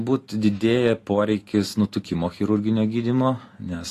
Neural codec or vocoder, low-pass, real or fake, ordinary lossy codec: none; 14.4 kHz; real; AAC, 48 kbps